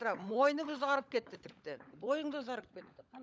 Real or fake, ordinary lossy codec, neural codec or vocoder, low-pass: fake; none; codec, 16 kHz, 16 kbps, FunCodec, trained on LibriTTS, 50 frames a second; none